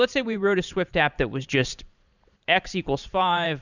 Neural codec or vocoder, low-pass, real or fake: vocoder, 44.1 kHz, 128 mel bands every 512 samples, BigVGAN v2; 7.2 kHz; fake